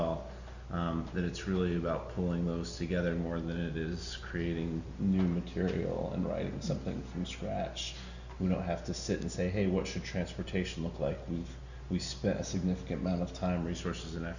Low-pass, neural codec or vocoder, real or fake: 7.2 kHz; none; real